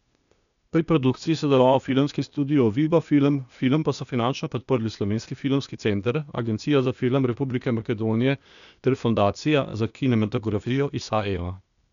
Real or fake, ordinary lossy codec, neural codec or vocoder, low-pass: fake; none; codec, 16 kHz, 0.8 kbps, ZipCodec; 7.2 kHz